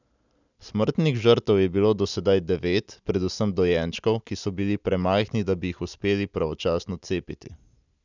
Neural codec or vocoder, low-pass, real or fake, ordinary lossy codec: none; 7.2 kHz; real; none